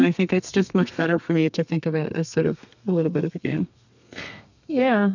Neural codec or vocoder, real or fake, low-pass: codec, 32 kHz, 1.9 kbps, SNAC; fake; 7.2 kHz